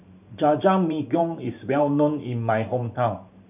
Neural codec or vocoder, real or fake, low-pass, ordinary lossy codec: vocoder, 44.1 kHz, 128 mel bands every 512 samples, BigVGAN v2; fake; 3.6 kHz; none